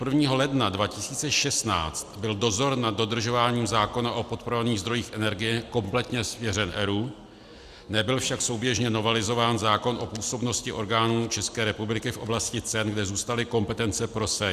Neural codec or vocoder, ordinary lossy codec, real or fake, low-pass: none; Opus, 64 kbps; real; 14.4 kHz